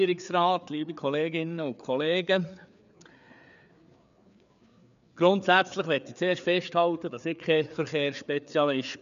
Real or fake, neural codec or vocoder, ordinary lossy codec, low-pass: fake; codec, 16 kHz, 4 kbps, FreqCodec, larger model; none; 7.2 kHz